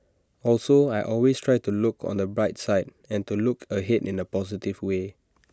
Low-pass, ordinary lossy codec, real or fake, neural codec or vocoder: none; none; real; none